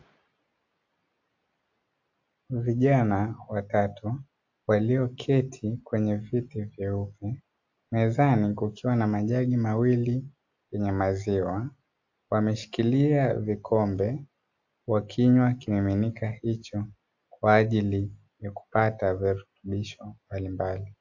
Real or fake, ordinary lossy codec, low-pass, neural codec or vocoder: real; AAC, 48 kbps; 7.2 kHz; none